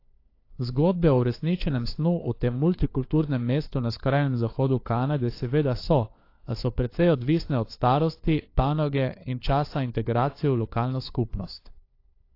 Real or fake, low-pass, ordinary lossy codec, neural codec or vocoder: fake; 5.4 kHz; AAC, 32 kbps; codec, 16 kHz, 4 kbps, FunCodec, trained on LibriTTS, 50 frames a second